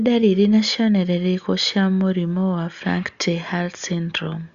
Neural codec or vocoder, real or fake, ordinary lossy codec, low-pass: none; real; Opus, 64 kbps; 7.2 kHz